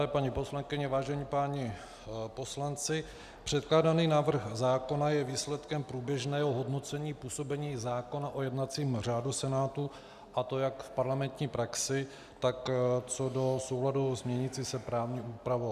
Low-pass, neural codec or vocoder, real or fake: 14.4 kHz; none; real